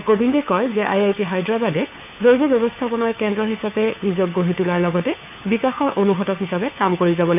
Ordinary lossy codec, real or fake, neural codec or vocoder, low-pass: none; fake; codec, 16 kHz, 4 kbps, FunCodec, trained on LibriTTS, 50 frames a second; 3.6 kHz